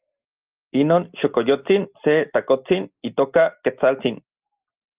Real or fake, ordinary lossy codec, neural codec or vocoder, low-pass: real; Opus, 32 kbps; none; 3.6 kHz